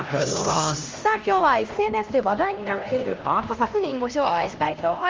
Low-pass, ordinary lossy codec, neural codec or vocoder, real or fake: 7.2 kHz; Opus, 32 kbps; codec, 16 kHz, 1 kbps, X-Codec, HuBERT features, trained on LibriSpeech; fake